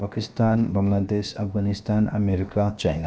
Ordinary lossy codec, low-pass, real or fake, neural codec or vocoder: none; none; fake; codec, 16 kHz, 0.7 kbps, FocalCodec